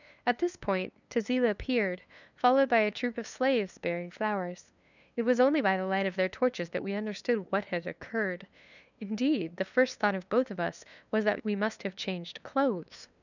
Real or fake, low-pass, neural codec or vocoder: fake; 7.2 kHz; codec, 16 kHz, 2 kbps, FunCodec, trained on LibriTTS, 25 frames a second